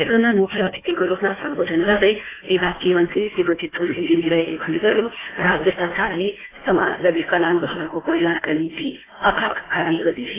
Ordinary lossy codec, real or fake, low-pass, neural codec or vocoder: AAC, 16 kbps; fake; 3.6 kHz; codec, 16 kHz, 1 kbps, FunCodec, trained on Chinese and English, 50 frames a second